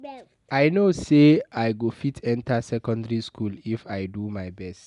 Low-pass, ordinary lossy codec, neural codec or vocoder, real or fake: 10.8 kHz; none; none; real